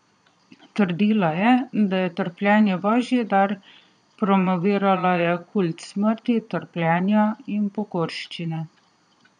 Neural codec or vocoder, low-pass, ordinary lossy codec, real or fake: vocoder, 22.05 kHz, 80 mel bands, Vocos; 9.9 kHz; none; fake